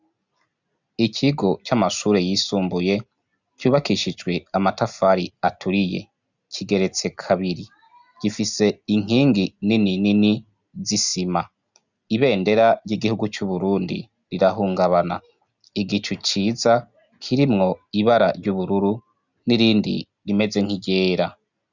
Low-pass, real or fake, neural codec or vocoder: 7.2 kHz; real; none